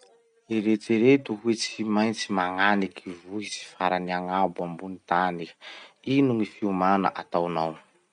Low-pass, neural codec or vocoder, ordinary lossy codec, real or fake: 9.9 kHz; none; none; real